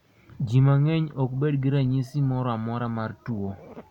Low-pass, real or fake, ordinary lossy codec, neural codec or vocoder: 19.8 kHz; real; none; none